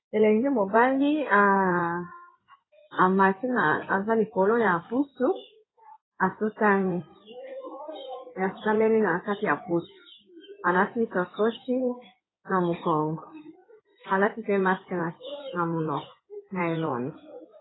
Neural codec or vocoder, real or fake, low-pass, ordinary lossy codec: codec, 16 kHz in and 24 kHz out, 2.2 kbps, FireRedTTS-2 codec; fake; 7.2 kHz; AAC, 16 kbps